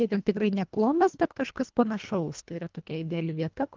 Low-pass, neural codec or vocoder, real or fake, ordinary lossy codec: 7.2 kHz; codec, 24 kHz, 1.5 kbps, HILCodec; fake; Opus, 32 kbps